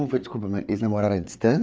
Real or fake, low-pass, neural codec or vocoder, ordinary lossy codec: fake; none; codec, 16 kHz, 4 kbps, FreqCodec, larger model; none